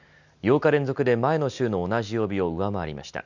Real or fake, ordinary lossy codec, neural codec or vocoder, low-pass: real; none; none; 7.2 kHz